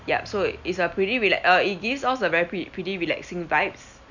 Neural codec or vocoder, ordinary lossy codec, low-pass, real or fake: none; none; 7.2 kHz; real